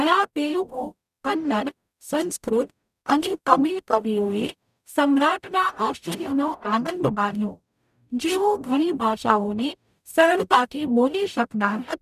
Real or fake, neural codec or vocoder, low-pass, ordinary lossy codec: fake; codec, 44.1 kHz, 0.9 kbps, DAC; 14.4 kHz; none